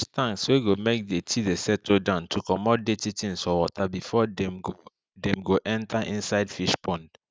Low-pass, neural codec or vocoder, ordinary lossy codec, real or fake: none; none; none; real